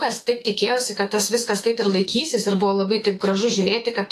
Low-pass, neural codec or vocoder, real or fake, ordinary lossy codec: 14.4 kHz; autoencoder, 48 kHz, 32 numbers a frame, DAC-VAE, trained on Japanese speech; fake; AAC, 48 kbps